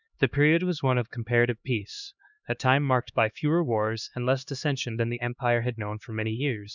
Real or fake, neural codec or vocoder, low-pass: fake; codec, 16 kHz, 2 kbps, X-Codec, HuBERT features, trained on LibriSpeech; 7.2 kHz